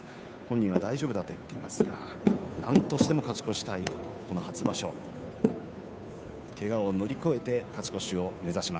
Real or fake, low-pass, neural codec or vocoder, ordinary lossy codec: fake; none; codec, 16 kHz, 2 kbps, FunCodec, trained on Chinese and English, 25 frames a second; none